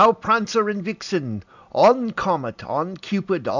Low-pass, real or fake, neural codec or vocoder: 7.2 kHz; real; none